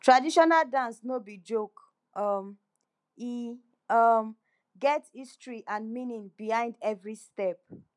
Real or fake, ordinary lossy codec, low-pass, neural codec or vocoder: real; none; 10.8 kHz; none